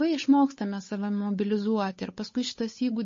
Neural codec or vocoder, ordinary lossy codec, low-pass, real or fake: codec, 16 kHz, 8 kbps, FunCodec, trained on Chinese and English, 25 frames a second; MP3, 32 kbps; 7.2 kHz; fake